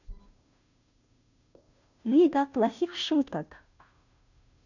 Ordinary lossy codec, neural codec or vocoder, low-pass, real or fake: none; codec, 16 kHz, 0.5 kbps, FunCodec, trained on Chinese and English, 25 frames a second; 7.2 kHz; fake